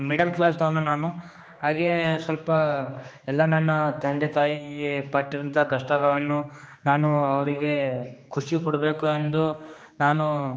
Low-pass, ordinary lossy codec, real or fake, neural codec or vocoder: none; none; fake; codec, 16 kHz, 2 kbps, X-Codec, HuBERT features, trained on general audio